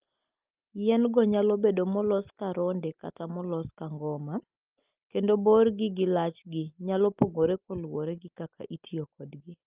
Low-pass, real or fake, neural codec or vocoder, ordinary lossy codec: 3.6 kHz; real; none; Opus, 32 kbps